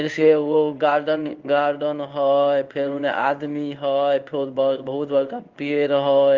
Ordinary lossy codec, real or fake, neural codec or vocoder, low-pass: Opus, 32 kbps; fake; codec, 16 kHz in and 24 kHz out, 1 kbps, XY-Tokenizer; 7.2 kHz